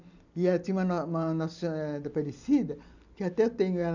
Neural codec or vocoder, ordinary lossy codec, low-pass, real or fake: none; none; 7.2 kHz; real